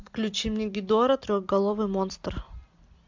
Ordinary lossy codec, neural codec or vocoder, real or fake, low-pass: MP3, 64 kbps; none; real; 7.2 kHz